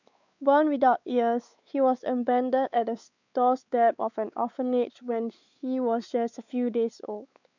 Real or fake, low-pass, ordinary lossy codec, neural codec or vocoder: fake; 7.2 kHz; none; codec, 16 kHz, 4 kbps, X-Codec, WavLM features, trained on Multilingual LibriSpeech